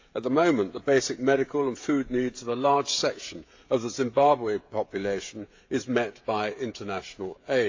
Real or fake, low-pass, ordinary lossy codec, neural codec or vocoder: fake; 7.2 kHz; AAC, 48 kbps; codec, 16 kHz, 16 kbps, FreqCodec, smaller model